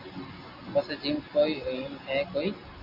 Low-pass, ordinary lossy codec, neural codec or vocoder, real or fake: 5.4 kHz; AAC, 48 kbps; none; real